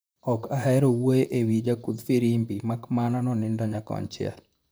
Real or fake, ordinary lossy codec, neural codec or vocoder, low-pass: fake; none; vocoder, 44.1 kHz, 128 mel bands, Pupu-Vocoder; none